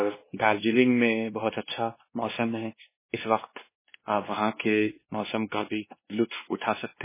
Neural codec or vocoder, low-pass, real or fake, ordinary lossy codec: codec, 16 kHz, 2 kbps, X-Codec, WavLM features, trained on Multilingual LibriSpeech; 3.6 kHz; fake; MP3, 16 kbps